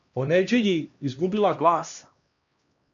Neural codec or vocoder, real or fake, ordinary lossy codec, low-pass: codec, 16 kHz, 1 kbps, X-Codec, HuBERT features, trained on LibriSpeech; fake; MP3, 64 kbps; 7.2 kHz